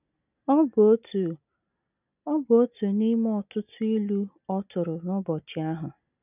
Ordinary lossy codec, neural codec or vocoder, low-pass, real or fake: none; none; 3.6 kHz; real